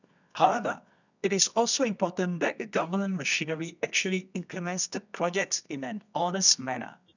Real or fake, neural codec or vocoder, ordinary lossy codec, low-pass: fake; codec, 24 kHz, 0.9 kbps, WavTokenizer, medium music audio release; none; 7.2 kHz